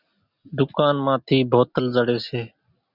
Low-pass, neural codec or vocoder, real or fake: 5.4 kHz; none; real